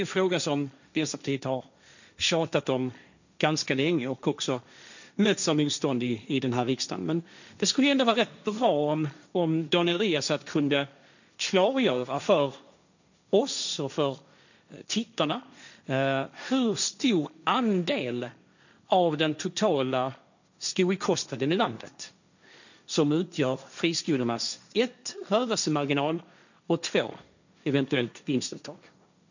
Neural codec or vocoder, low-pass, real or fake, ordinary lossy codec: codec, 16 kHz, 1.1 kbps, Voila-Tokenizer; 7.2 kHz; fake; none